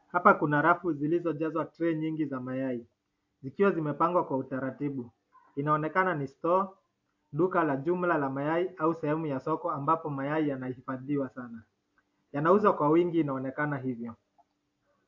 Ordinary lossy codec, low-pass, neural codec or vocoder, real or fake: AAC, 48 kbps; 7.2 kHz; none; real